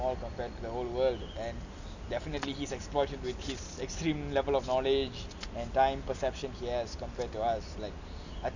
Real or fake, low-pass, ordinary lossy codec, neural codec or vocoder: real; 7.2 kHz; none; none